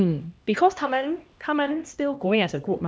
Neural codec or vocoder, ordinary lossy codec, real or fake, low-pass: codec, 16 kHz, 1 kbps, X-Codec, HuBERT features, trained on LibriSpeech; none; fake; none